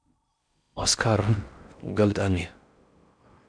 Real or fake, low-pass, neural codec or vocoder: fake; 9.9 kHz; codec, 16 kHz in and 24 kHz out, 0.6 kbps, FocalCodec, streaming, 4096 codes